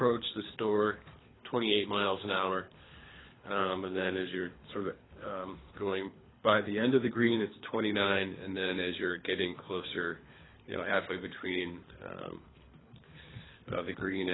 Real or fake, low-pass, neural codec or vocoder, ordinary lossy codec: fake; 7.2 kHz; codec, 24 kHz, 3 kbps, HILCodec; AAC, 16 kbps